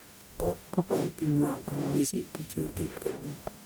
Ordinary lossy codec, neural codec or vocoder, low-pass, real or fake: none; codec, 44.1 kHz, 0.9 kbps, DAC; none; fake